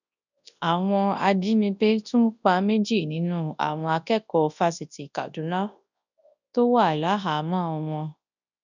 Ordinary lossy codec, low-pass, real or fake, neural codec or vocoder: none; 7.2 kHz; fake; codec, 24 kHz, 0.9 kbps, WavTokenizer, large speech release